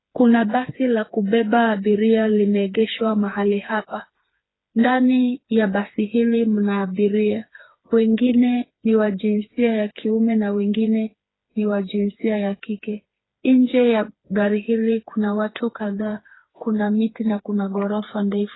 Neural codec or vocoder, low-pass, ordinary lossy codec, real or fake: codec, 16 kHz, 4 kbps, FreqCodec, smaller model; 7.2 kHz; AAC, 16 kbps; fake